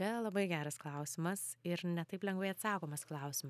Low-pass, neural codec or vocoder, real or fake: 14.4 kHz; none; real